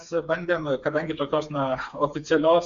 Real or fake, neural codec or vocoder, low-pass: fake; codec, 16 kHz, 4 kbps, FreqCodec, smaller model; 7.2 kHz